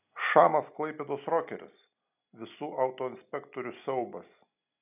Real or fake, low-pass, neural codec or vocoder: real; 3.6 kHz; none